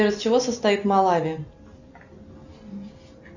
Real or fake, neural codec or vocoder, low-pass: real; none; 7.2 kHz